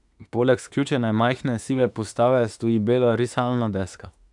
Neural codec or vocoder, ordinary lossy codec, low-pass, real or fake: autoencoder, 48 kHz, 32 numbers a frame, DAC-VAE, trained on Japanese speech; none; 10.8 kHz; fake